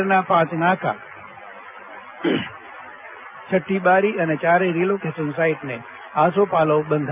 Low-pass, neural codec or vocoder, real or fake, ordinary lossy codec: 3.6 kHz; none; real; none